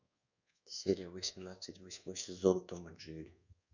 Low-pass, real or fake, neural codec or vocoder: 7.2 kHz; fake; codec, 24 kHz, 1.2 kbps, DualCodec